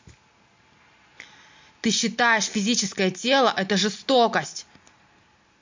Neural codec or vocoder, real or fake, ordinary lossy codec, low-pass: none; real; MP3, 48 kbps; 7.2 kHz